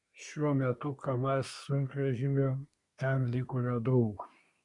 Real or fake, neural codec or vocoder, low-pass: fake; codec, 24 kHz, 1 kbps, SNAC; 10.8 kHz